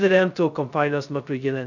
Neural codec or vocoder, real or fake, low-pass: codec, 16 kHz, 0.2 kbps, FocalCodec; fake; 7.2 kHz